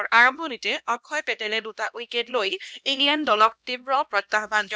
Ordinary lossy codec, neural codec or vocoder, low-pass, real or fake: none; codec, 16 kHz, 1 kbps, X-Codec, HuBERT features, trained on LibriSpeech; none; fake